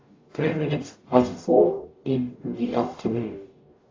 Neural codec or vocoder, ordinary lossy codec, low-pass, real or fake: codec, 44.1 kHz, 0.9 kbps, DAC; AAC, 32 kbps; 7.2 kHz; fake